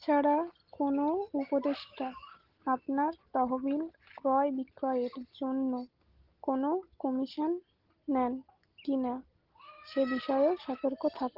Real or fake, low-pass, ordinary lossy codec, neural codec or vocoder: real; 5.4 kHz; Opus, 16 kbps; none